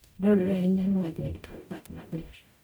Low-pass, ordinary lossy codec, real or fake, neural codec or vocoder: none; none; fake; codec, 44.1 kHz, 0.9 kbps, DAC